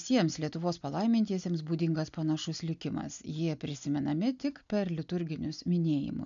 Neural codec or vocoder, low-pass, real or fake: none; 7.2 kHz; real